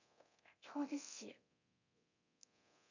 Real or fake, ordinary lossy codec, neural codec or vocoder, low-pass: fake; none; codec, 24 kHz, 0.9 kbps, DualCodec; 7.2 kHz